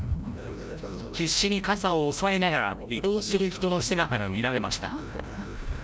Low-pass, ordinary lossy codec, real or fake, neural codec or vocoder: none; none; fake; codec, 16 kHz, 0.5 kbps, FreqCodec, larger model